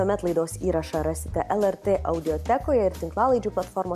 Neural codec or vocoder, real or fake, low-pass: none; real; 14.4 kHz